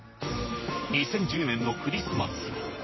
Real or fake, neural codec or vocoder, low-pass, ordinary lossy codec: fake; vocoder, 44.1 kHz, 128 mel bands, Pupu-Vocoder; 7.2 kHz; MP3, 24 kbps